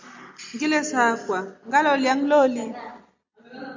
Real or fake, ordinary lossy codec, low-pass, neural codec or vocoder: real; MP3, 64 kbps; 7.2 kHz; none